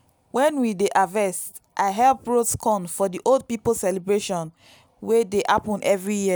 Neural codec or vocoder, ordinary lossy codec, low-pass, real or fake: none; none; none; real